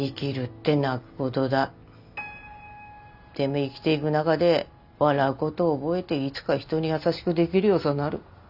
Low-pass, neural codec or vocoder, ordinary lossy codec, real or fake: 5.4 kHz; none; none; real